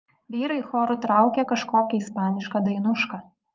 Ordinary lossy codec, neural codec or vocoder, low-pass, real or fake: Opus, 24 kbps; vocoder, 44.1 kHz, 80 mel bands, Vocos; 7.2 kHz; fake